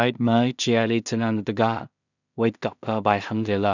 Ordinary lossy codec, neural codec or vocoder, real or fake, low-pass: none; codec, 16 kHz in and 24 kHz out, 0.4 kbps, LongCat-Audio-Codec, two codebook decoder; fake; 7.2 kHz